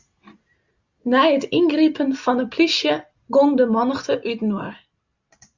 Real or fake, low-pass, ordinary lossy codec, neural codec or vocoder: real; 7.2 kHz; Opus, 64 kbps; none